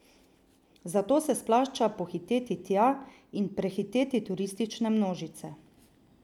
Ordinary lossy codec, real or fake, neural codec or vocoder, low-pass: none; real; none; 19.8 kHz